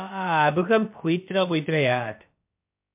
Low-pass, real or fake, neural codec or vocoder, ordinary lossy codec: 3.6 kHz; fake; codec, 16 kHz, about 1 kbps, DyCAST, with the encoder's durations; MP3, 32 kbps